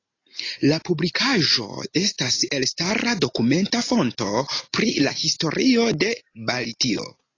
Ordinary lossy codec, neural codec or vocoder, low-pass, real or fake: AAC, 32 kbps; none; 7.2 kHz; real